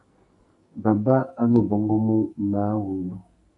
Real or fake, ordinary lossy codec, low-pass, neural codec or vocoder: fake; AAC, 48 kbps; 10.8 kHz; codec, 44.1 kHz, 2.6 kbps, SNAC